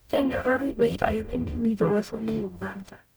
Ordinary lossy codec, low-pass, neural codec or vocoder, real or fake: none; none; codec, 44.1 kHz, 0.9 kbps, DAC; fake